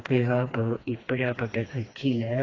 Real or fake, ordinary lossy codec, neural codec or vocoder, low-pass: fake; AAC, 32 kbps; codec, 16 kHz, 2 kbps, FreqCodec, smaller model; 7.2 kHz